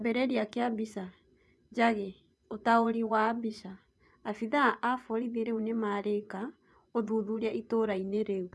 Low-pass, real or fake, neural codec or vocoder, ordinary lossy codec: none; fake; vocoder, 24 kHz, 100 mel bands, Vocos; none